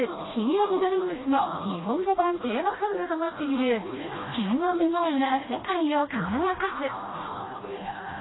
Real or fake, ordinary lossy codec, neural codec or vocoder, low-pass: fake; AAC, 16 kbps; codec, 16 kHz, 1 kbps, FreqCodec, smaller model; 7.2 kHz